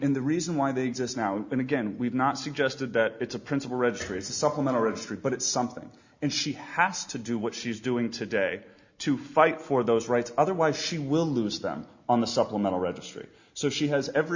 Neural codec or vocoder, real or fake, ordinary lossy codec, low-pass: none; real; Opus, 64 kbps; 7.2 kHz